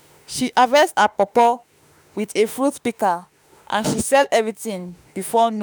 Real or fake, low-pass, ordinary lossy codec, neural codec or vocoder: fake; none; none; autoencoder, 48 kHz, 32 numbers a frame, DAC-VAE, trained on Japanese speech